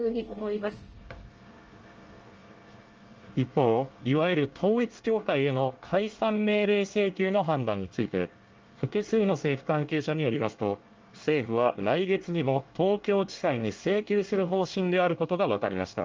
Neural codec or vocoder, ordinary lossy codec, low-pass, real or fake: codec, 24 kHz, 1 kbps, SNAC; Opus, 24 kbps; 7.2 kHz; fake